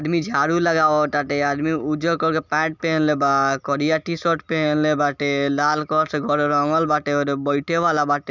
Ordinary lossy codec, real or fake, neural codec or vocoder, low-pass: none; real; none; 7.2 kHz